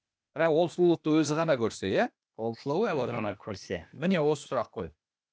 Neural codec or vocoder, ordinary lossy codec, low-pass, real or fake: codec, 16 kHz, 0.8 kbps, ZipCodec; none; none; fake